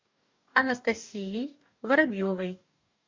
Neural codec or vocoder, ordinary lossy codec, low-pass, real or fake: codec, 44.1 kHz, 2.6 kbps, DAC; MP3, 64 kbps; 7.2 kHz; fake